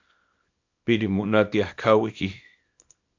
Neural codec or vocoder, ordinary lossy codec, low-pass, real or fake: codec, 24 kHz, 0.9 kbps, WavTokenizer, small release; AAC, 48 kbps; 7.2 kHz; fake